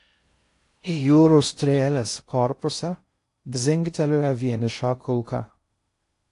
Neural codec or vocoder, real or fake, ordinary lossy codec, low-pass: codec, 16 kHz in and 24 kHz out, 0.6 kbps, FocalCodec, streaming, 4096 codes; fake; AAC, 48 kbps; 10.8 kHz